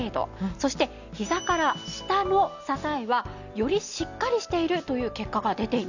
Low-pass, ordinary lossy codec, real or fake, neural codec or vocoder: 7.2 kHz; none; real; none